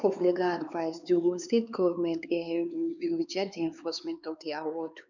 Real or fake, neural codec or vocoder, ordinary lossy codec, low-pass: fake; codec, 16 kHz, 4 kbps, X-Codec, HuBERT features, trained on LibriSpeech; none; 7.2 kHz